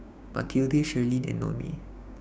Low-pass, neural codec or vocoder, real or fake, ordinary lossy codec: none; codec, 16 kHz, 6 kbps, DAC; fake; none